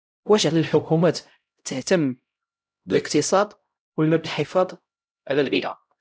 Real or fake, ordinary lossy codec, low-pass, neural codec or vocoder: fake; none; none; codec, 16 kHz, 0.5 kbps, X-Codec, HuBERT features, trained on LibriSpeech